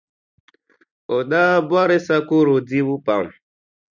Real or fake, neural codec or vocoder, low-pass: real; none; 7.2 kHz